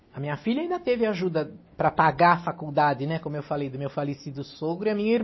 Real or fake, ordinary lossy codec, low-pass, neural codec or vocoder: real; MP3, 24 kbps; 7.2 kHz; none